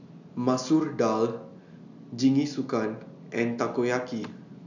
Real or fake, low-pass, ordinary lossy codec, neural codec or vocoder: real; 7.2 kHz; none; none